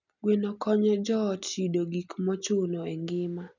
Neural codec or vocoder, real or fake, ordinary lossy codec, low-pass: none; real; none; 7.2 kHz